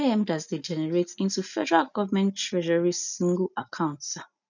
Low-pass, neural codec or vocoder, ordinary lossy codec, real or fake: 7.2 kHz; none; none; real